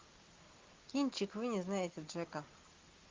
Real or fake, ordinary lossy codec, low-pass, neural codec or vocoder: real; Opus, 32 kbps; 7.2 kHz; none